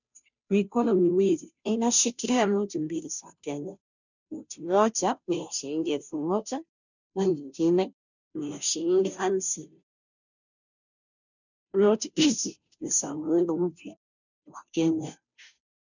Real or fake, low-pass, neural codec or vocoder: fake; 7.2 kHz; codec, 16 kHz, 0.5 kbps, FunCodec, trained on Chinese and English, 25 frames a second